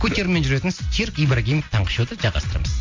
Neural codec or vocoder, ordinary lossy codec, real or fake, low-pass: none; MP3, 48 kbps; real; 7.2 kHz